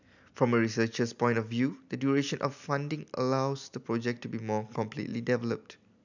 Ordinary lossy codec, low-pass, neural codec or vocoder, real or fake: none; 7.2 kHz; none; real